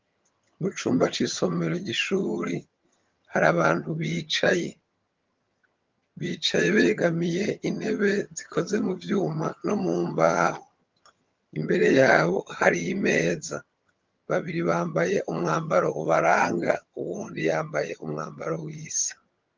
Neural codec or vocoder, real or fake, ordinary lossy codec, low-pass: vocoder, 22.05 kHz, 80 mel bands, HiFi-GAN; fake; Opus, 32 kbps; 7.2 kHz